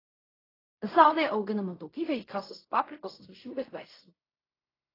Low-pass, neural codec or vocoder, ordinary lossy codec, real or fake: 5.4 kHz; codec, 16 kHz in and 24 kHz out, 0.4 kbps, LongCat-Audio-Codec, fine tuned four codebook decoder; AAC, 24 kbps; fake